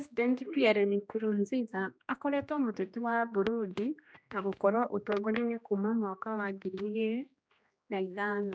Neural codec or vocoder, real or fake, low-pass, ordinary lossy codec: codec, 16 kHz, 1 kbps, X-Codec, HuBERT features, trained on general audio; fake; none; none